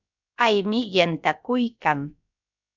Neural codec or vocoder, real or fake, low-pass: codec, 16 kHz, about 1 kbps, DyCAST, with the encoder's durations; fake; 7.2 kHz